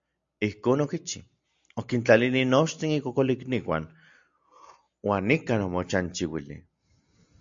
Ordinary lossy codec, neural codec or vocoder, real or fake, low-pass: AAC, 64 kbps; none; real; 7.2 kHz